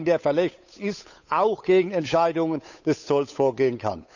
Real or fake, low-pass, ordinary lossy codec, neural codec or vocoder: fake; 7.2 kHz; none; codec, 16 kHz, 8 kbps, FunCodec, trained on Chinese and English, 25 frames a second